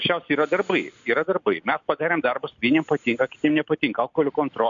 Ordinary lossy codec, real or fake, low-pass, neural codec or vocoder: AAC, 64 kbps; real; 7.2 kHz; none